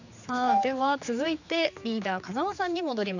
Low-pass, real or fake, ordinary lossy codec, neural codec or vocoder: 7.2 kHz; fake; none; codec, 16 kHz, 4 kbps, X-Codec, HuBERT features, trained on general audio